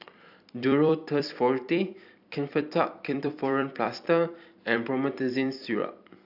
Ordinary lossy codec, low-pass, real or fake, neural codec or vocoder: AAC, 48 kbps; 5.4 kHz; fake; vocoder, 44.1 kHz, 128 mel bands every 256 samples, BigVGAN v2